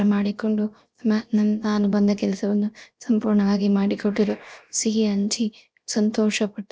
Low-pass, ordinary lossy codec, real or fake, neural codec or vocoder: none; none; fake; codec, 16 kHz, 0.7 kbps, FocalCodec